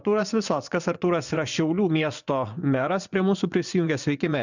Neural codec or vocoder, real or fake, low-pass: vocoder, 24 kHz, 100 mel bands, Vocos; fake; 7.2 kHz